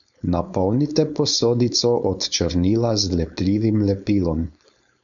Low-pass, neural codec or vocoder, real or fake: 7.2 kHz; codec, 16 kHz, 4.8 kbps, FACodec; fake